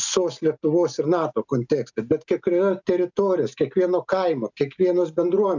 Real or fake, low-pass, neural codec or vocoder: real; 7.2 kHz; none